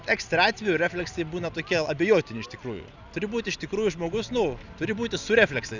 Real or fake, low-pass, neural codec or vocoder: real; 7.2 kHz; none